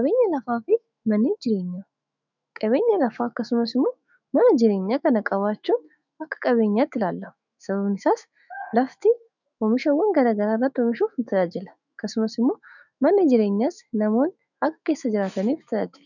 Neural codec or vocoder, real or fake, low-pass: autoencoder, 48 kHz, 128 numbers a frame, DAC-VAE, trained on Japanese speech; fake; 7.2 kHz